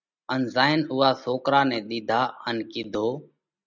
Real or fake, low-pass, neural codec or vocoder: real; 7.2 kHz; none